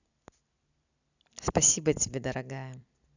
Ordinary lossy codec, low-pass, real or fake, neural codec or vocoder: none; 7.2 kHz; real; none